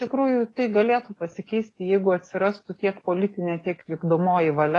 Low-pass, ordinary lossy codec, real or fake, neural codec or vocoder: 10.8 kHz; AAC, 32 kbps; fake; codec, 44.1 kHz, 7.8 kbps, DAC